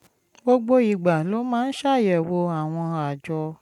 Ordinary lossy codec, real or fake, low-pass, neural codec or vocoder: none; real; 19.8 kHz; none